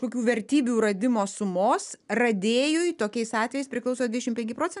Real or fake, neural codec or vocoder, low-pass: real; none; 10.8 kHz